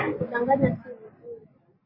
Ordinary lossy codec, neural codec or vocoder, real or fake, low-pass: MP3, 24 kbps; none; real; 5.4 kHz